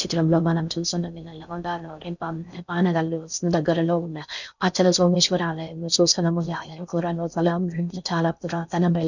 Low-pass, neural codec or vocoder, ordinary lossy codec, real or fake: 7.2 kHz; codec, 16 kHz in and 24 kHz out, 0.6 kbps, FocalCodec, streaming, 4096 codes; none; fake